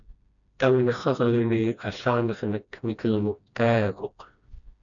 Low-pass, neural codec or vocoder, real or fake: 7.2 kHz; codec, 16 kHz, 1 kbps, FreqCodec, smaller model; fake